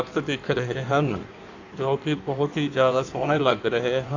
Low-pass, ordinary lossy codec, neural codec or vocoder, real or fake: 7.2 kHz; none; codec, 16 kHz in and 24 kHz out, 1.1 kbps, FireRedTTS-2 codec; fake